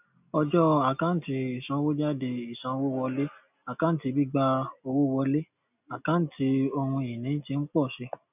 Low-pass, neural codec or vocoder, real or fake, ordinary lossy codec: 3.6 kHz; none; real; none